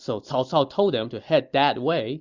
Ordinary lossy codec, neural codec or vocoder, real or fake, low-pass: Opus, 64 kbps; none; real; 7.2 kHz